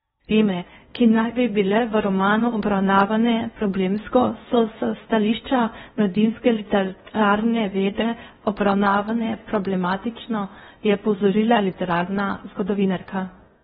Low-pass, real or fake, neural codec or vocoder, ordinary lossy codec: 10.8 kHz; fake; codec, 16 kHz in and 24 kHz out, 0.6 kbps, FocalCodec, streaming, 4096 codes; AAC, 16 kbps